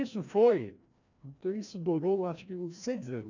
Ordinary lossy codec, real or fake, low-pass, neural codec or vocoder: none; fake; 7.2 kHz; codec, 16 kHz, 1 kbps, FreqCodec, larger model